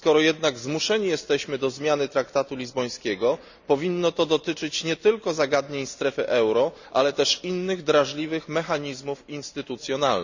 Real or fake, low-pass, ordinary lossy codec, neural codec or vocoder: real; 7.2 kHz; none; none